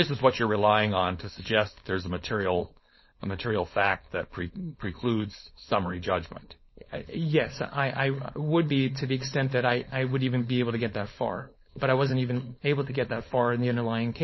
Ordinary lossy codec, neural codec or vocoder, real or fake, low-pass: MP3, 24 kbps; codec, 16 kHz, 4.8 kbps, FACodec; fake; 7.2 kHz